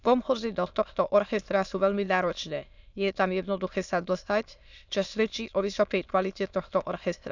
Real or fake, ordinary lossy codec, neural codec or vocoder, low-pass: fake; none; autoencoder, 22.05 kHz, a latent of 192 numbers a frame, VITS, trained on many speakers; 7.2 kHz